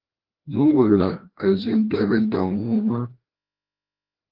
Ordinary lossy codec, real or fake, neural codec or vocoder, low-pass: Opus, 16 kbps; fake; codec, 16 kHz, 1 kbps, FreqCodec, larger model; 5.4 kHz